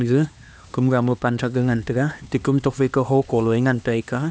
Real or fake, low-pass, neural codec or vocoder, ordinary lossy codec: fake; none; codec, 16 kHz, 2 kbps, X-Codec, HuBERT features, trained on LibriSpeech; none